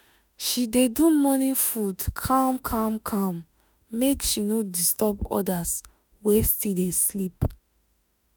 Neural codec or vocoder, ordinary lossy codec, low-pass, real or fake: autoencoder, 48 kHz, 32 numbers a frame, DAC-VAE, trained on Japanese speech; none; none; fake